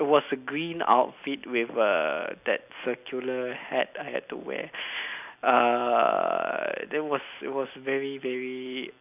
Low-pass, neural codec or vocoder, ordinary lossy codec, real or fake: 3.6 kHz; none; none; real